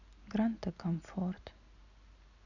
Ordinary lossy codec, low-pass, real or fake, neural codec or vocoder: none; 7.2 kHz; real; none